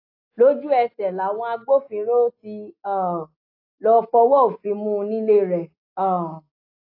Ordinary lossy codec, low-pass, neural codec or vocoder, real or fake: AAC, 32 kbps; 5.4 kHz; none; real